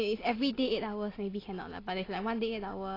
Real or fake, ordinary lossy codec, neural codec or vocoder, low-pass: real; AAC, 24 kbps; none; 5.4 kHz